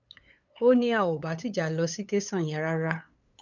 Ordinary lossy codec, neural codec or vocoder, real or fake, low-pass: none; codec, 16 kHz, 8 kbps, FunCodec, trained on LibriTTS, 25 frames a second; fake; 7.2 kHz